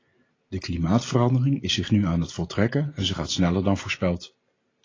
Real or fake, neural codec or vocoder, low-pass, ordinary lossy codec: real; none; 7.2 kHz; AAC, 32 kbps